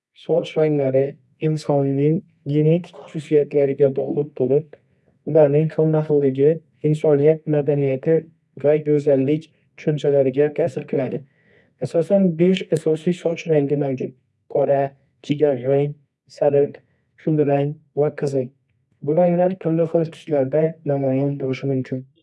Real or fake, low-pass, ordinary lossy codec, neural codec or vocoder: fake; none; none; codec, 24 kHz, 0.9 kbps, WavTokenizer, medium music audio release